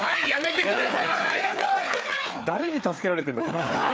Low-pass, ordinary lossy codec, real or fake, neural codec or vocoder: none; none; fake; codec, 16 kHz, 4 kbps, FreqCodec, larger model